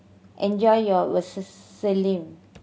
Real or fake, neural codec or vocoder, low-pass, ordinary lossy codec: real; none; none; none